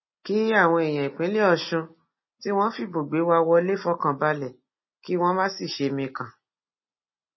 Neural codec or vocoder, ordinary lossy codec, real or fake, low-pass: none; MP3, 24 kbps; real; 7.2 kHz